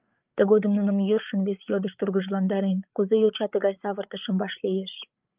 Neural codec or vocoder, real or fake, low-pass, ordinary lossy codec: codec, 16 kHz, 8 kbps, FreqCodec, larger model; fake; 3.6 kHz; Opus, 24 kbps